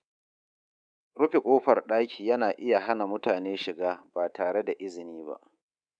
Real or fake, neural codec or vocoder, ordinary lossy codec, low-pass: fake; codec, 24 kHz, 3.1 kbps, DualCodec; none; 9.9 kHz